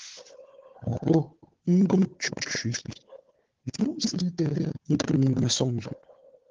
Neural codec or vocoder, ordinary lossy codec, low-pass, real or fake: codec, 16 kHz, 4 kbps, FunCodec, trained on Chinese and English, 50 frames a second; Opus, 16 kbps; 7.2 kHz; fake